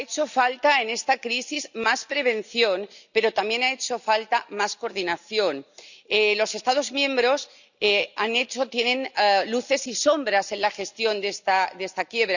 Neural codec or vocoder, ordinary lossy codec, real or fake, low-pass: none; none; real; 7.2 kHz